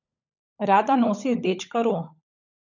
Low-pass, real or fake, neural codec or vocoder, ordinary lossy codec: 7.2 kHz; fake; codec, 16 kHz, 16 kbps, FunCodec, trained on LibriTTS, 50 frames a second; none